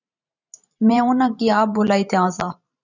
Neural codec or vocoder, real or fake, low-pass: vocoder, 44.1 kHz, 128 mel bands every 512 samples, BigVGAN v2; fake; 7.2 kHz